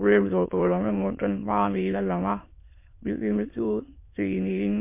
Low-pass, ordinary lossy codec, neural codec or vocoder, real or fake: 3.6 kHz; MP3, 24 kbps; autoencoder, 22.05 kHz, a latent of 192 numbers a frame, VITS, trained on many speakers; fake